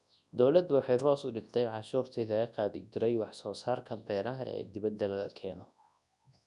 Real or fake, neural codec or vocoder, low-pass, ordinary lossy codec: fake; codec, 24 kHz, 0.9 kbps, WavTokenizer, large speech release; 10.8 kHz; none